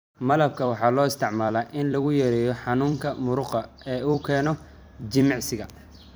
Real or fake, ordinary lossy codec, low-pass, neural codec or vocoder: fake; none; none; vocoder, 44.1 kHz, 128 mel bands every 256 samples, BigVGAN v2